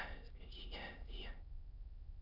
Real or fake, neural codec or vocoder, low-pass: fake; autoencoder, 22.05 kHz, a latent of 192 numbers a frame, VITS, trained on many speakers; 5.4 kHz